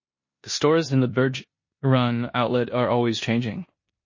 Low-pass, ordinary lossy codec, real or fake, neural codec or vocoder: 7.2 kHz; MP3, 32 kbps; fake; codec, 16 kHz in and 24 kHz out, 0.9 kbps, LongCat-Audio-Codec, four codebook decoder